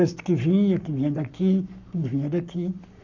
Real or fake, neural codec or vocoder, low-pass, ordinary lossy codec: fake; codec, 44.1 kHz, 7.8 kbps, Pupu-Codec; 7.2 kHz; none